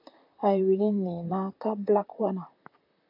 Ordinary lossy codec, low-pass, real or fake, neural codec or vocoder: AAC, 48 kbps; 5.4 kHz; fake; vocoder, 44.1 kHz, 128 mel bands, Pupu-Vocoder